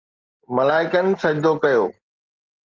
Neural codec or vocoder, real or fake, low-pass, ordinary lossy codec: none; real; 7.2 kHz; Opus, 24 kbps